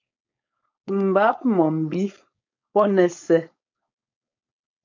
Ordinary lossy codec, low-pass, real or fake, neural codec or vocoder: MP3, 48 kbps; 7.2 kHz; fake; codec, 16 kHz, 4.8 kbps, FACodec